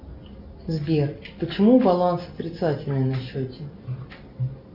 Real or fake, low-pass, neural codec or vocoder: real; 5.4 kHz; none